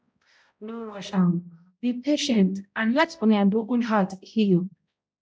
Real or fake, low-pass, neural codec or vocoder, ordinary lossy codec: fake; none; codec, 16 kHz, 0.5 kbps, X-Codec, HuBERT features, trained on balanced general audio; none